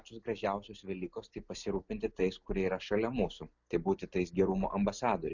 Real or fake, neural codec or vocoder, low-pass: real; none; 7.2 kHz